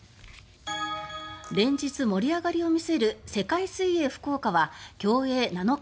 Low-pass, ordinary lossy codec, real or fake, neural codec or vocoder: none; none; real; none